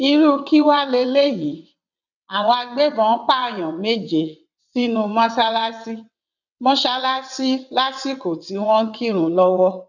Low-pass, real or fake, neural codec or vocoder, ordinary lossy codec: 7.2 kHz; fake; vocoder, 22.05 kHz, 80 mel bands, WaveNeXt; none